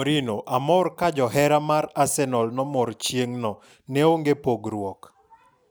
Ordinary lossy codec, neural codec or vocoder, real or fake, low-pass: none; none; real; none